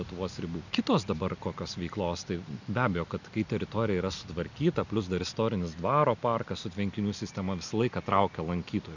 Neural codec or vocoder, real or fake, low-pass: none; real; 7.2 kHz